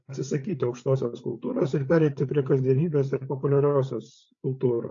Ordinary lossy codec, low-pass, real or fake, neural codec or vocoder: AAC, 48 kbps; 7.2 kHz; fake; codec, 16 kHz, 8 kbps, FreqCodec, larger model